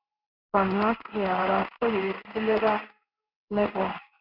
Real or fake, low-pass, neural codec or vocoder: fake; 5.4 kHz; codec, 16 kHz in and 24 kHz out, 1 kbps, XY-Tokenizer